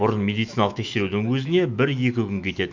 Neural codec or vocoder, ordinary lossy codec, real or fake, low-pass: autoencoder, 48 kHz, 128 numbers a frame, DAC-VAE, trained on Japanese speech; MP3, 64 kbps; fake; 7.2 kHz